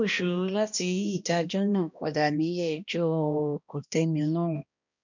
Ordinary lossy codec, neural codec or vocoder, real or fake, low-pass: none; codec, 16 kHz, 1 kbps, X-Codec, HuBERT features, trained on balanced general audio; fake; 7.2 kHz